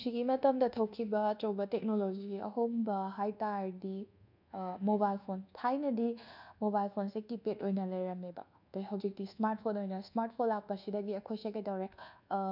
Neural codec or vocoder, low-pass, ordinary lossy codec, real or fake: codec, 24 kHz, 1.2 kbps, DualCodec; 5.4 kHz; none; fake